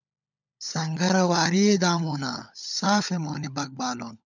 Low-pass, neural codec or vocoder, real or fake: 7.2 kHz; codec, 16 kHz, 16 kbps, FunCodec, trained on LibriTTS, 50 frames a second; fake